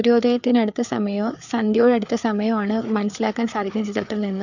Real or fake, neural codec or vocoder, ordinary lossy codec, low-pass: fake; codec, 16 kHz, 4 kbps, FreqCodec, larger model; none; 7.2 kHz